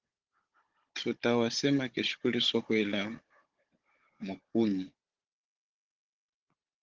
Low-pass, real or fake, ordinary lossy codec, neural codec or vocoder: 7.2 kHz; fake; Opus, 16 kbps; codec, 16 kHz, 16 kbps, FunCodec, trained on Chinese and English, 50 frames a second